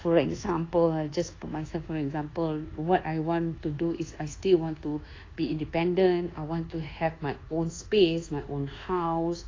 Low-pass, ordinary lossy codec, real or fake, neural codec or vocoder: 7.2 kHz; AAC, 32 kbps; fake; codec, 24 kHz, 1.2 kbps, DualCodec